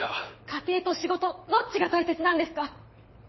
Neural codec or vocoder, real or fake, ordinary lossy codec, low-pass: codec, 24 kHz, 6 kbps, HILCodec; fake; MP3, 24 kbps; 7.2 kHz